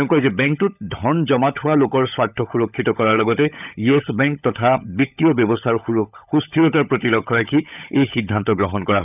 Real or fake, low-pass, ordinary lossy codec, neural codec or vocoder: fake; 3.6 kHz; none; codec, 16 kHz, 16 kbps, FunCodec, trained on LibriTTS, 50 frames a second